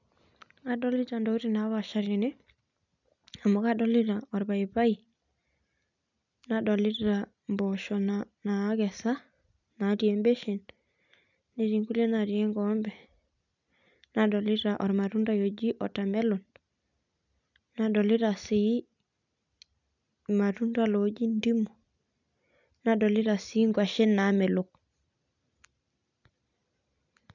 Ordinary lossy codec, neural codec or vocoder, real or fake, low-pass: none; none; real; 7.2 kHz